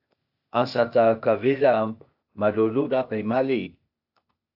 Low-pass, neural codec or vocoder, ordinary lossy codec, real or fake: 5.4 kHz; codec, 16 kHz, 0.8 kbps, ZipCodec; AAC, 48 kbps; fake